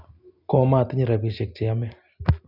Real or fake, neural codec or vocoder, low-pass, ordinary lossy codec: real; none; 5.4 kHz; none